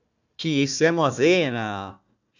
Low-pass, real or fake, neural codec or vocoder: 7.2 kHz; fake; codec, 16 kHz, 1 kbps, FunCodec, trained on Chinese and English, 50 frames a second